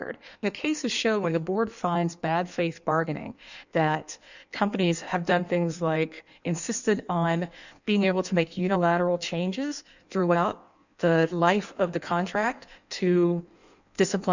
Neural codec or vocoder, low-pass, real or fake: codec, 16 kHz in and 24 kHz out, 1.1 kbps, FireRedTTS-2 codec; 7.2 kHz; fake